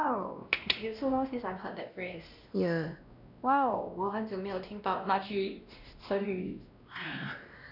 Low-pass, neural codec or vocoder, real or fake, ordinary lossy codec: 5.4 kHz; codec, 16 kHz, 1 kbps, X-Codec, WavLM features, trained on Multilingual LibriSpeech; fake; none